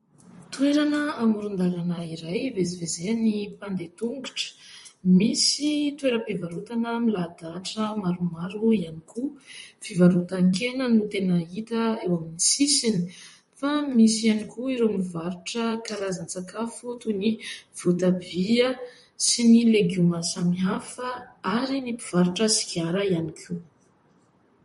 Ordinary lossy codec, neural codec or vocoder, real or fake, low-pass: MP3, 48 kbps; vocoder, 44.1 kHz, 128 mel bands, Pupu-Vocoder; fake; 19.8 kHz